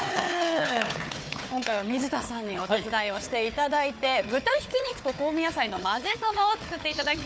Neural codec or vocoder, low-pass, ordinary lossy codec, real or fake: codec, 16 kHz, 4 kbps, FunCodec, trained on Chinese and English, 50 frames a second; none; none; fake